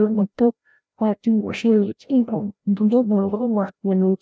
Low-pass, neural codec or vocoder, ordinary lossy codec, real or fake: none; codec, 16 kHz, 0.5 kbps, FreqCodec, larger model; none; fake